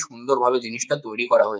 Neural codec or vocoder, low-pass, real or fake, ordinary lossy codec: codec, 16 kHz, 4 kbps, X-Codec, HuBERT features, trained on balanced general audio; none; fake; none